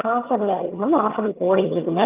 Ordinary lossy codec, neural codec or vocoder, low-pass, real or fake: Opus, 24 kbps; vocoder, 22.05 kHz, 80 mel bands, HiFi-GAN; 3.6 kHz; fake